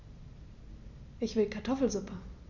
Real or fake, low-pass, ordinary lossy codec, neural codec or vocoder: real; 7.2 kHz; none; none